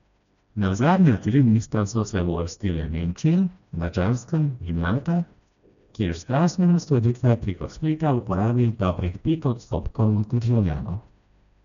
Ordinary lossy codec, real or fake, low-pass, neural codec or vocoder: none; fake; 7.2 kHz; codec, 16 kHz, 1 kbps, FreqCodec, smaller model